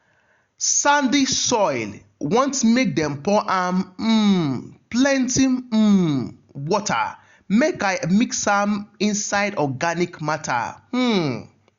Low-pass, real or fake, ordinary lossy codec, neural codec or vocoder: 7.2 kHz; real; Opus, 64 kbps; none